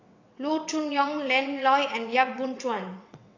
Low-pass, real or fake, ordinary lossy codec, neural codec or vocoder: 7.2 kHz; fake; AAC, 48 kbps; vocoder, 22.05 kHz, 80 mel bands, WaveNeXt